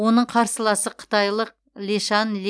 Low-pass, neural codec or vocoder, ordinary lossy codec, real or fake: none; none; none; real